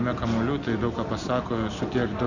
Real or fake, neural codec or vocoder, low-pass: real; none; 7.2 kHz